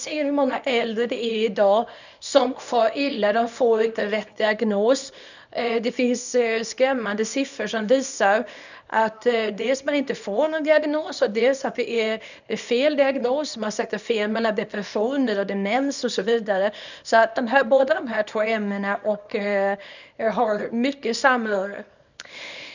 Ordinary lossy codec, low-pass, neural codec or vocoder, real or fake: none; 7.2 kHz; codec, 24 kHz, 0.9 kbps, WavTokenizer, small release; fake